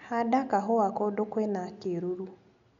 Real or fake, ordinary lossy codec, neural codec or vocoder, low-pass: real; none; none; 7.2 kHz